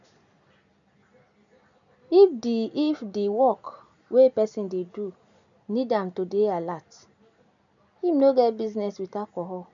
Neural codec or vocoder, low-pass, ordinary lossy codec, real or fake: none; 7.2 kHz; none; real